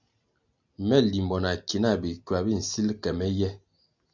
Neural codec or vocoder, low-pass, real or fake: none; 7.2 kHz; real